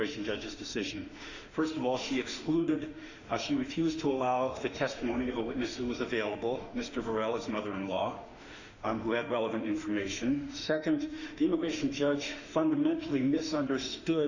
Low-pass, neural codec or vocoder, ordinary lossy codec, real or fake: 7.2 kHz; autoencoder, 48 kHz, 32 numbers a frame, DAC-VAE, trained on Japanese speech; Opus, 64 kbps; fake